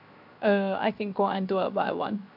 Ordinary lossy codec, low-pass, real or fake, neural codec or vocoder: none; 5.4 kHz; fake; codec, 16 kHz, 0.7 kbps, FocalCodec